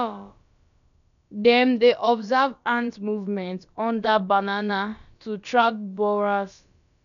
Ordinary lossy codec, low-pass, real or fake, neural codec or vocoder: none; 7.2 kHz; fake; codec, 16 kHz, about 1 kbps, DyCAST, with the encoder's durations